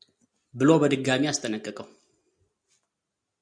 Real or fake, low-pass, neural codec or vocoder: real; 9.9 kHz; none